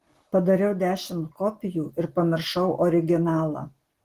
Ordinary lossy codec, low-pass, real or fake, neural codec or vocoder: Opus, 16 kbps; 14.4 kHz; real; none